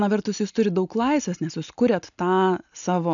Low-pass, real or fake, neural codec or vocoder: 7.2 kHz; real; none